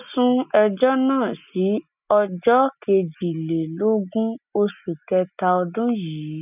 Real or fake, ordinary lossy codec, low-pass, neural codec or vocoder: real; none; 3.6 kHz; none